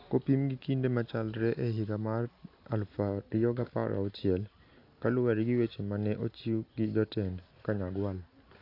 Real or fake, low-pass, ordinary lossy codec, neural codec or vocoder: real; 5.4 kHz; AAC, 32 kbps; none